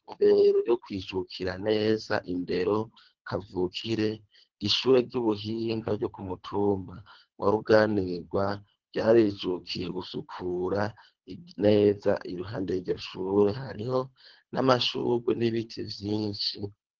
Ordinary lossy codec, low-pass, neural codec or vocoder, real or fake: Opus, 16 kbps; 7.2 kHz; codec, 24 kHz, 3 kbps, HILCodec; fake